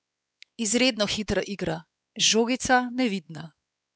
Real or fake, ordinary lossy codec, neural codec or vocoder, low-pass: fake; none; codec, 16 kHz, 4 kbps, X-Codec, WavLM features, trained on Multilingual LibriSpeech; none